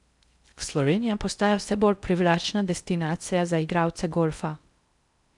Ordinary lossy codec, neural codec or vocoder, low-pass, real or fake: none; codec, 16 kHz in and 24 kHz out, 0.6 kbps, FocalCodec, streaming, 4096 codes; 10.8 kHz; fake